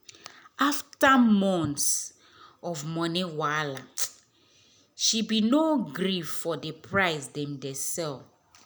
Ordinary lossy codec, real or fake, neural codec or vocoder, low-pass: none; real; none; none